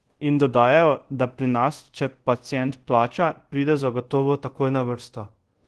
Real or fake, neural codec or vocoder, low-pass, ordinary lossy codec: fake; codec, 24 kHz, 0.5 kbps, DualCodec; 10.8 kHz; Opus, 16 kbps